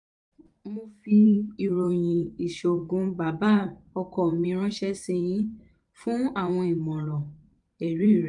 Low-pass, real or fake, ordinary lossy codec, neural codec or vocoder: 10.8 kHz; fake; none; vocoder, 44.1 kHz, 128 mel bands every 512 samples, BigVGAN v2